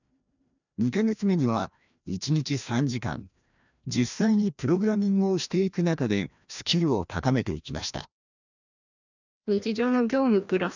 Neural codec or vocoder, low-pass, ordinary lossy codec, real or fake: codec, 16 kHz, 1 kbps, FreqCodec, larger model; 7.2 kHz; none; fake